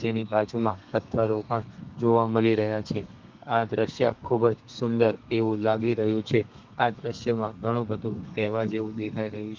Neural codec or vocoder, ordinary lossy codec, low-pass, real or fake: codec, 32 kHz, 1.9 kbps, SNAC; Opus, 24 kbps; 7.2 kHz; fake